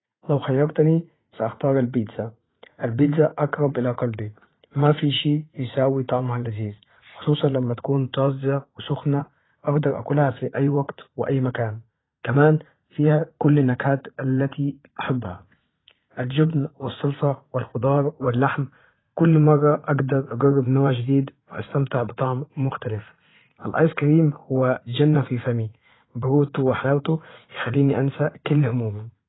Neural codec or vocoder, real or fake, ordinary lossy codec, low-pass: vocoder, 44.1 kHz, 80 mel bands, Vocos; fake; AAC, 16 kbps; 7.2 kHz